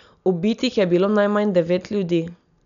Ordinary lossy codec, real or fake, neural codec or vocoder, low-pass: none; real; none; 7.2 kHz